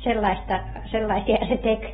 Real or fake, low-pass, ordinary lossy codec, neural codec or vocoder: real; 19.8 kHz; AAC, 16 kbps; none